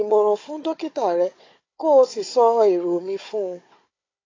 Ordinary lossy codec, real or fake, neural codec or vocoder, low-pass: AAC, 32 kbps; fake; codec, 16 kHz, 4 kbps, FunCodec, trained on Chinese and English, 50 frames a second; 7.2 kHz